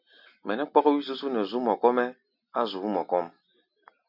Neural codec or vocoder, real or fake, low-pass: none; real; 5.4 kHz